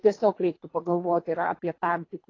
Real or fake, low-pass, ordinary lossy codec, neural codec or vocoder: fake; 7.2 kHz; AAC, 32 kbps; codec, 24 kHz, 3 kbps, HILCodec